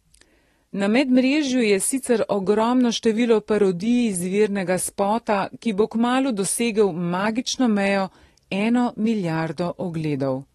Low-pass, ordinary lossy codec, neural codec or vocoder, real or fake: 19.8 kHz; AAC, 32 kbps; none; real